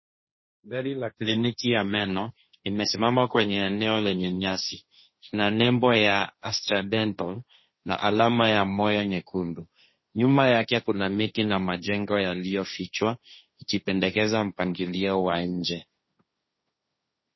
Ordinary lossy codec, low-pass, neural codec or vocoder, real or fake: MP3, 24 kbps; 7.2 kHz; codec, 16 kHz, 1.1 kbps, Voila-Tokenizer; fake